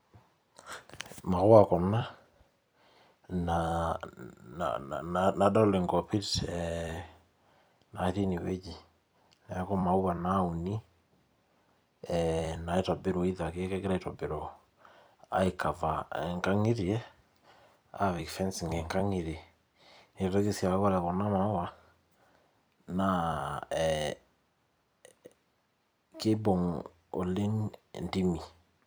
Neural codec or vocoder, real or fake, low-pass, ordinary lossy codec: vocoder, 44.1 kHz, 128 mel bands every 256 samples, BigVGAN v2; fake; none; none